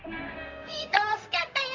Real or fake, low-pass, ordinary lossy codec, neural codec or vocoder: fake; 7.2 kHz; MP3, 48 kbps; codec, 16 kHz, 0.4 kbps, LongCat-Audio-Codec